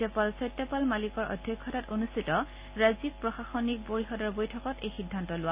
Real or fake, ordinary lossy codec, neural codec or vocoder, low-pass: real; Opus, 64 kbps; none; 3.6 kHz